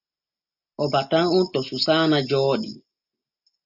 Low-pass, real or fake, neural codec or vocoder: 5.4 kHz; real; none